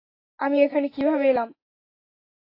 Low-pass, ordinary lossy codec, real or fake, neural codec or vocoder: 5.4 kHz; AAC, 32 kbps; real; none